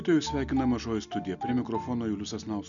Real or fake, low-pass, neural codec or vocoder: real; 7.2 kHz; none